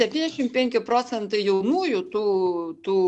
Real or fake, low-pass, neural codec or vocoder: real; 10.8 kHz; none